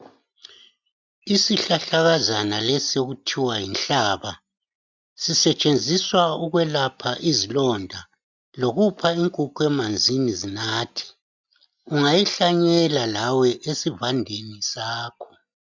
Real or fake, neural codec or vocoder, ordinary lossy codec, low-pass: real; none; MP3, 64 kbps; 7.2 kHz